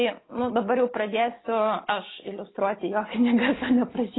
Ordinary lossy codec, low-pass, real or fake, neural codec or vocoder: AAC, 16 kbps; 7.2 kHz; real; none